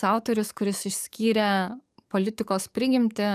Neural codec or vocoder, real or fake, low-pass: codec, 44.1 kHz, 7.8 kbps, DAC; fake; 14.4 kHz